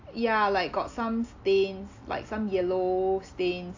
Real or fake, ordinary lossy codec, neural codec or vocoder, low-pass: real; AAC, 48 kbps; none; 7.2 kHz